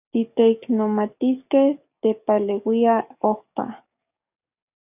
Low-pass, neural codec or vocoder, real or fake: 3.6 kHz; codec, 44.1 kHz, 7.8 kbps, DAC; fake